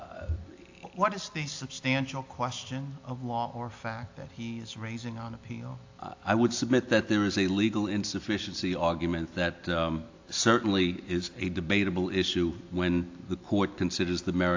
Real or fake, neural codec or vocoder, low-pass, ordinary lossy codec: real; none; 7.2 kHz; AAC, 48 kbps